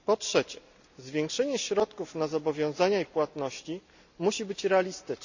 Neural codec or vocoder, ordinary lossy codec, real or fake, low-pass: none; none; real; 7.2 kHz